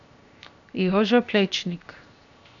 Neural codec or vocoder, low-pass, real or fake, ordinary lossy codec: codec, 16 kHz, 0.7 kbps, FocalCodec; 7.2 kHz; fake; none